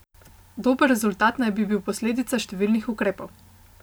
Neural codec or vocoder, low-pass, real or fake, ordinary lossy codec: none; none; real; none